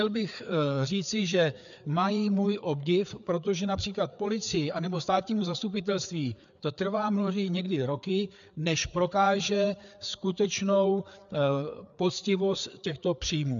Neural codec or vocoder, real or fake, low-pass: codec, 16 kHz, 4 kbps, FreqCodec, larger model; fake; 7.2 kHz